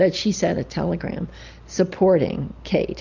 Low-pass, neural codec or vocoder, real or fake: 7.2 kHz; none; real